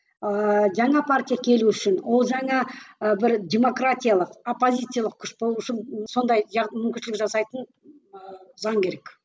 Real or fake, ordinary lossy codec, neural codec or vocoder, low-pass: real; none; none; none